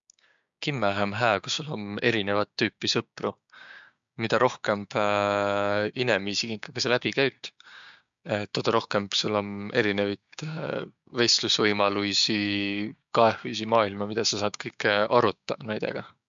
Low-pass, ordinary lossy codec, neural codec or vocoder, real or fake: 7.2 kHz; MP3, 64 kbps; codec, 16 kHz, 6 kbps, DAC; fake